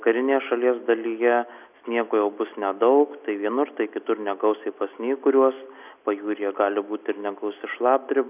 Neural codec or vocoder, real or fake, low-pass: none; real; 3.6 kHz